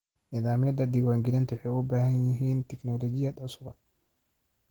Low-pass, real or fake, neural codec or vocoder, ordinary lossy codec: 19.8 kHz; real; none; Opus, 16 kbps